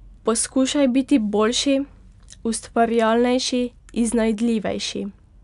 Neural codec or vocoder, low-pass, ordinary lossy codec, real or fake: none; 10.8 kHz; none; real